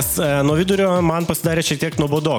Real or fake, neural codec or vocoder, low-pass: real; none; 19.8 kHz